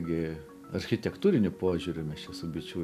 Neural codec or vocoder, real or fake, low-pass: none; real; 14.4 kHz